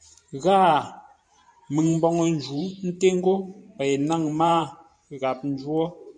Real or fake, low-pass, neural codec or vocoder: fake; 9.9 kHz; vocoder, 44.1 kHz, 128 mel bands every 512 samples, BigVGAN v2